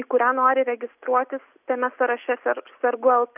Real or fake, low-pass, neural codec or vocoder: real; 3.6 kHz; none